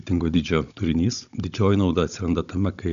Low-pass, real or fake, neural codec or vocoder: 7.2 kHz; fake; codec, 16 kHz, 16 kbps, FunCodec, trained on Chinese and English, 50 frames a second